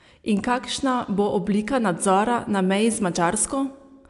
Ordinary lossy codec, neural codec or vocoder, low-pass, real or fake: AAC, 96 kbps; none; 10.8 kHz; real